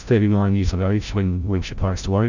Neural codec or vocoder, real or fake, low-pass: codec, 16 kHz, 0.5 kbps, FreqCodec, larger model; fake; 7.2 kHz